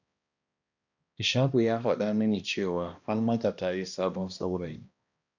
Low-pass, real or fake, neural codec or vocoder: 7.2 kHz; fake; codec, 16 kHz, 1 kbps, X-Codec, HuBERT features, trained on balanced general audio